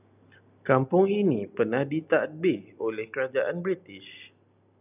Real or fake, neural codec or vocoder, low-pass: real; none; 3.6 kHz